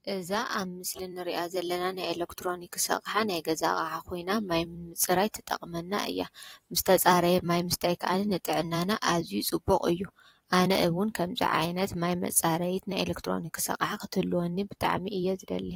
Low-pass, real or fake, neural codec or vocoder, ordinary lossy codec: 19.8 kHz; fake; vocoder, 44.1 kHz, 128 mel bands every 256 samples, BigVGAN v2; AAC, 48 kbps